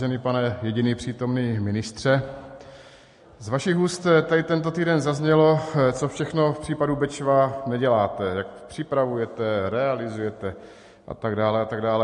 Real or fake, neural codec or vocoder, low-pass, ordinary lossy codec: real; none; 14.4 kHz; MP3, 48 kbps